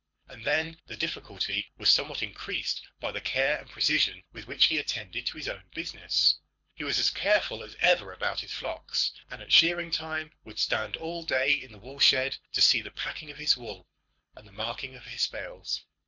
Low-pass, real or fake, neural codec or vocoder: 7.2 kHz; fake; codec, 24 kHz, 6 kbps, HILCodec